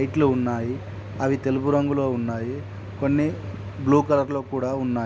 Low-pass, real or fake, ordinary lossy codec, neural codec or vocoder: none; real; none; none